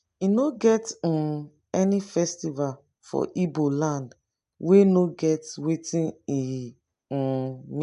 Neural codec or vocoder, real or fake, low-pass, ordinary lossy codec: none; real; 10.8 kHz; AAC, 96 kbps